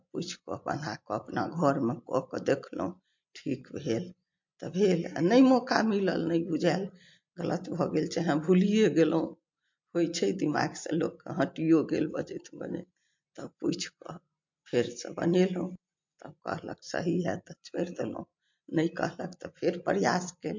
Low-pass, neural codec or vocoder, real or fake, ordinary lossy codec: 7.2 kHz; none; real; MP3, 48 kbps